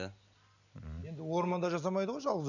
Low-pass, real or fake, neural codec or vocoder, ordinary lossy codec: 7.2 kHz; real; none; none